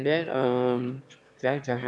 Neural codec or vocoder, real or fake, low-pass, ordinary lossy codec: autoencoder, 22.05 kHz, a latent of 192 numbers a frame, VITS, trained on one speaker; fake; none; none